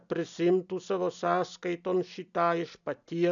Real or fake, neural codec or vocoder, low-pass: real; none; 7.2 kHz